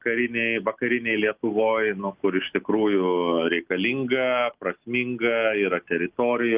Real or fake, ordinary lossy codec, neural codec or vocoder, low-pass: real; Opus, 24 kbps; none; 3.6 kHz